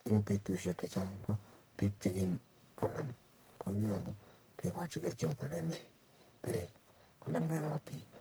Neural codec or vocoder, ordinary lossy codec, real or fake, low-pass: codec, 44.1 kHz, 1.7 kbps, Pupu-Codec; none; fake; none